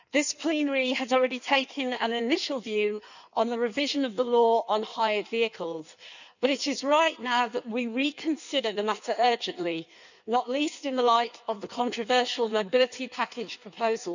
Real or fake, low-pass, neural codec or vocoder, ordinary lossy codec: fake; 7.2 kHz; codec, 16 kHz in and 24 kHz out, 1.1 kbps, FireRedTTS-2 codec; none